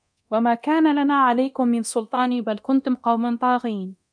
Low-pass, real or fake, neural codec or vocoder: 9.9 kHz; fake; codec, 24 kHz, 0.9 kbps, DualCodec